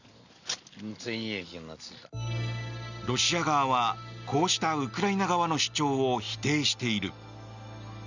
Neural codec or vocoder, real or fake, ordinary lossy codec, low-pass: none; real; none; 7.2 kHz